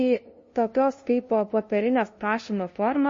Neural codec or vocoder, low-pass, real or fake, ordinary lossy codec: codec, 16 kHz, 0.5 kbps, FunCodec, trained on LibriTTS, 25 frames a second; 7.2 kHz; fake; MP3, 32 kbps